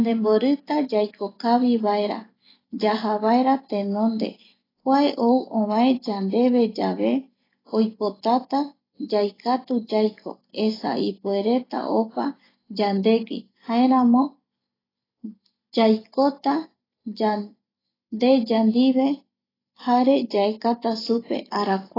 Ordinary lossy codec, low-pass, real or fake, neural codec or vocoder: AAC, 24 kbps; 5.4 kHz; real; none